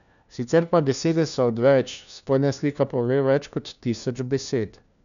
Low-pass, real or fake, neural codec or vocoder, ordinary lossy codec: 7.2 kHz; fake; codec, 16 kHz, 1 kbps, FunCodec, trained on LibriTTS, 50 frames a second; none